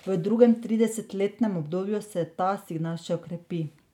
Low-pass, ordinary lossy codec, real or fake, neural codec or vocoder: 19.8 kHz; none; real; none